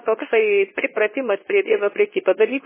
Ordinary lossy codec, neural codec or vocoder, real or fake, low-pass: MP3, 16 kbps; codec, 24 kHz, 0.9 kbps, WavTokenizer, medium speech release version 2; fake; 3.6 kHz